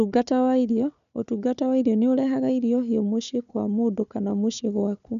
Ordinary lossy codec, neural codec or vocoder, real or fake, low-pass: none; codec, 16 kHz, 4 kbps, FunCodec, trained on Chinese and English, 50 frames a second; fake; 7.2 kHz